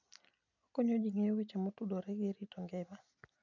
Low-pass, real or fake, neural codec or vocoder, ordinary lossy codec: 7.2 kHz; real; none; none